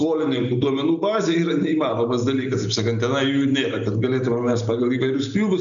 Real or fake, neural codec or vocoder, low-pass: real; none; 7.2 kHz